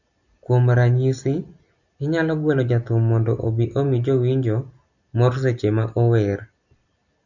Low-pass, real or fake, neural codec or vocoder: 7.2 kHz; real; none